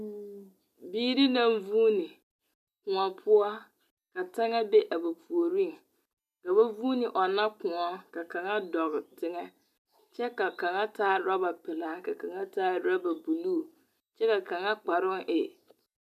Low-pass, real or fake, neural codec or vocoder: 14.4 kHz; real; none